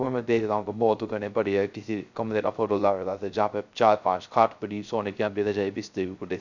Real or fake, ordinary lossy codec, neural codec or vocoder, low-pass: fake; none; codec, 16 kHz, 0.3 kbps, FocalCodec; 7.2 kHz